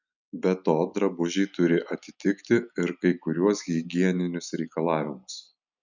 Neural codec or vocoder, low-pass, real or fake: none; 7.2 kHz; real